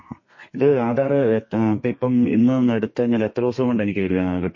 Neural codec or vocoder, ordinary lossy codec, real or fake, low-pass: codec, 32 kHz, 1.9 kbps, SNAC; MP3, 32 kbps; fake; 7.2 kHz